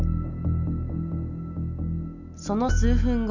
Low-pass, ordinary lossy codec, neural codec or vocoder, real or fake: 7.2 kHz; none; none; real